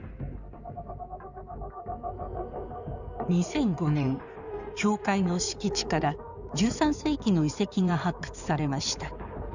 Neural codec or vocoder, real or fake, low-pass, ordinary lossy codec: codec, 16 kHz in and 24 kHz out, 2.2 kbps, FireRedTTS-2 codec; fake; 7.2 kHz; none